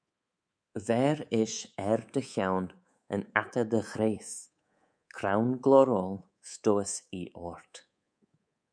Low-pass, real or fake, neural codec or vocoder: 9.9 kHz; fake; codec, 24 kHz, 3.1 kbps, DualCodec